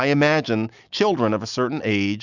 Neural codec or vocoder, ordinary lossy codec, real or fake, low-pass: none; Opus, 64 kbps; real; 7.2 kHz